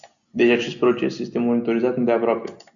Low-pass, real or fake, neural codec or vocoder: 7.2 kHz; real; none